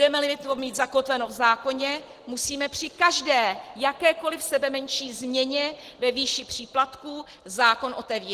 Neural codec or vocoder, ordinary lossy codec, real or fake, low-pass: none; Opus, 16 kbps; real; 14.4 kHz